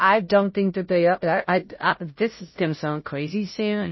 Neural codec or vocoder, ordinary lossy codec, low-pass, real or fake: codec, 16 kHz, 0.5 kbps, FunCodec, trained on Chinese and English, 25 frames a second; MP3, 24 kbps; 7.2 kHz; fake